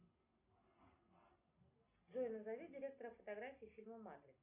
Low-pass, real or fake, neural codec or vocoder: 3.6 kHz; real; none